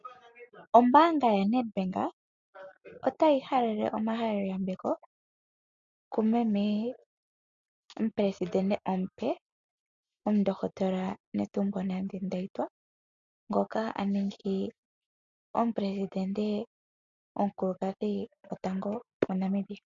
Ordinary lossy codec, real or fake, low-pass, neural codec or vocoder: MP3, 64 kbps; real; 7.2 kHz; none